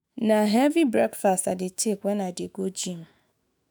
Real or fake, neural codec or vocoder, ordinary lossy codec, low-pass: fake; autoencoder, 48 kHz, 128 numbers a frame, DAC-VAE, trained on Japanese speech; none; none